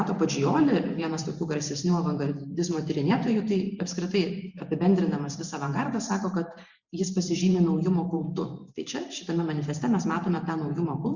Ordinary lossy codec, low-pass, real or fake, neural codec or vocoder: Opus, 64 kbps; 7.2 kHz; fake; vocoder, 44.1 kHz, 128 mel bands every 512 samples, BigVGAN v2